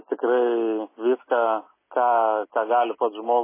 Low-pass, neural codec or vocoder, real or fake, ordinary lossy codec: 3.6 kHz; none; real; MP3, 16 kbps